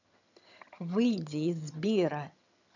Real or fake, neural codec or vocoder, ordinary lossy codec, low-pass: fake; vocoder, 22.05 kHz, 80 mel bands, HiFi-GAN; none; 7.2 kHz